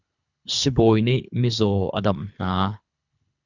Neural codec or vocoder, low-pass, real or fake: codec, 24 kHz, 3 kbps, HILCodec; 7.2 kHz; fake